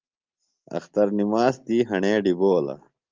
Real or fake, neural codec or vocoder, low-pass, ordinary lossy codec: real; none; 7.2 kHz; Opus, 32 kbps